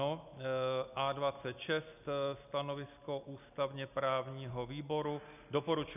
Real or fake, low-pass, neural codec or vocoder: real; 3.6 kHz; none